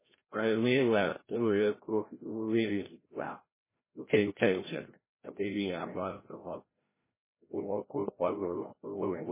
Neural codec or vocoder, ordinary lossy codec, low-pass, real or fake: codec, 16 kHz, 0.5 kbps, FreqCodec, larger model; MP3, 16 kbps; 3.6 kHz; fake